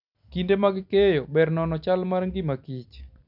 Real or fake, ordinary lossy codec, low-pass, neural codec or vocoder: real; none; 5.4 kHz; none